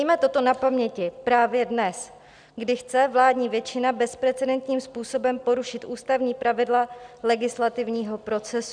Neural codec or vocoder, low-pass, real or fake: none; 9.9 kHz; real